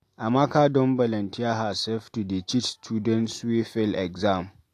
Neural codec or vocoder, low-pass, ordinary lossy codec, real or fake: none; 14.4 kHz; AAC, 64 kbps; real